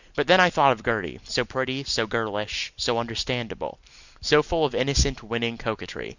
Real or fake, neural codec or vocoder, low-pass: real; none; 7.2 kHz